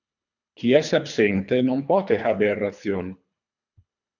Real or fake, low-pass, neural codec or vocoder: fake; 7.2 kHz; codec, 24 kHz, 3 kbps, HILCodec